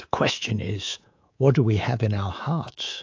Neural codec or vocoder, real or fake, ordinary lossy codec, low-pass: codec, 24 kHz, 3.1 kbps, DualCodec; fake; AAC, 48 kbps; 7.2 kHz